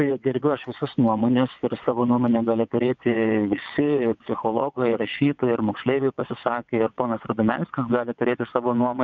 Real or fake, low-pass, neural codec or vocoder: fake; 7.2 kHz; vocoder, 22.05 kHz, 80 mel bands, WaveNeXt